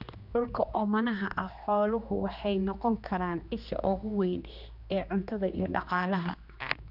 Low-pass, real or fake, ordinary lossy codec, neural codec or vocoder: 5.4 kHz; fake; none; codec, 16 kHz, 2 kbps, X-Codec, HuBERT features, trained on general audio